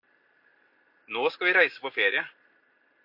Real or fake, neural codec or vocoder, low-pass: real; none; 5.4 kHz